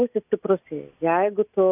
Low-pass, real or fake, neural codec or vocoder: 3.6 kHz; real; none